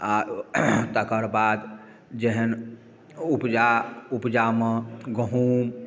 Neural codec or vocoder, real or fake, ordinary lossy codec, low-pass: none; real; none; none